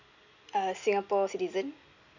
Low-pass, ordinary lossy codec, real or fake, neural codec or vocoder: 7.2 kHz; none; real; none